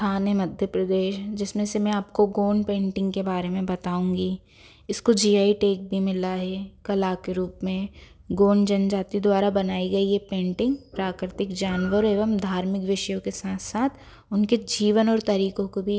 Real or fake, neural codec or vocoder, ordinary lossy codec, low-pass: real; none; none; none